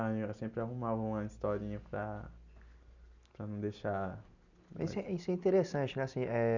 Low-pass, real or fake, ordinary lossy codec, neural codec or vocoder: 7.2 kHz; real; none; none